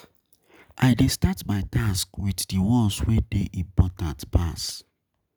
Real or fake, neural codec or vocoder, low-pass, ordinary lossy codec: real; none; none; none